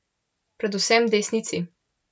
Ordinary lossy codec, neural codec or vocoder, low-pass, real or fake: none; none; none; real